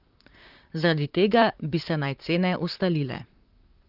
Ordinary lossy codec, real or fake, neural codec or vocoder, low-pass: Opus, 32 kbps; fake; vocoder, 44.1 kHz, 128 mel bands, Pupu-Vocoder; 5.4 kHz